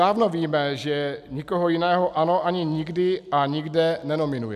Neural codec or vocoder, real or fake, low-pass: none; real; 14.4 kHz